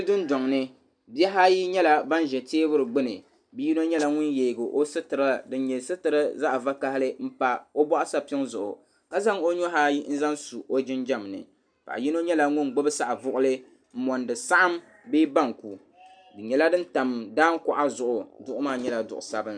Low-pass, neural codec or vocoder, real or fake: 9.9 kHz; none; real